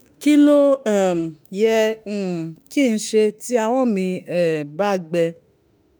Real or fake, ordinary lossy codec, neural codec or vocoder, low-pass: fake; none; autoencoder, 48 kHz, 32 numbers a frame, DAC-VAE, trained on Japanese speech; none